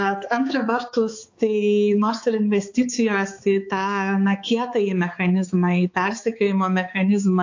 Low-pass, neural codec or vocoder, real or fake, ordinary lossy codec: 7.2 kHz; codec, 16 kHz, 4 kbps, X-Codec, HuBERT features, trained on balanced general audio; fake; AAC, 48 kbps